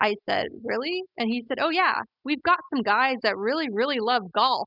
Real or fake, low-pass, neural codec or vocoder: real; 5.4 kHz; none